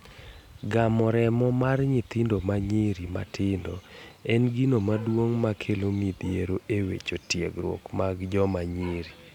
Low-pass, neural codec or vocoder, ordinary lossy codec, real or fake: 19.8 kHz; none; none; real